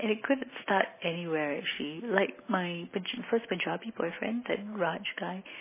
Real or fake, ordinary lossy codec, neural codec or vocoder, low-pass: real; MP3, 16 kbps; none; 3.6 kHz